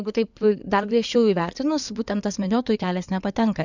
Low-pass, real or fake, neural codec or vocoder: 7.2 kHz; fake; codec, 16 kHz in and 24 kHz out, 2.2 kbps, FireRedTTS-2 codec